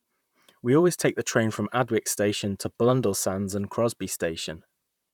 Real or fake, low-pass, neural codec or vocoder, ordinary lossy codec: fake; 19.8 kHz; vocoder, 44.1 kHz, 128 mel bands, Pupu-Vocoder; none